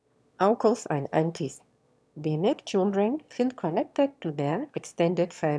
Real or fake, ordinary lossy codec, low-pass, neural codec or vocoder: fake; none; none; autoencoder, 22.05 kHz, a latent of 192 numbers a frame, VITS, trained on one speaker